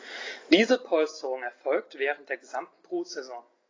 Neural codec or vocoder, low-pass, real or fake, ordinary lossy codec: none; 7.2 kHz; real; AAC, 32 kbps